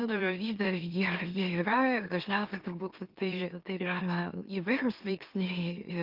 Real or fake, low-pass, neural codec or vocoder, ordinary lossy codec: fake; 5.4 kHz; autoencoder, 44.1 kHz, a latent of 192 numbers a frame, MeloTTS; Opus, 32 kbps